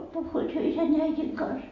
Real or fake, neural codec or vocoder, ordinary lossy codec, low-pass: real; none; AAC, 32 kbps; 7.2 kHz